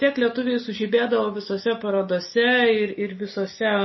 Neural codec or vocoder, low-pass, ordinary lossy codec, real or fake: none; 7.2 kHz; MP3, 24 kbps; real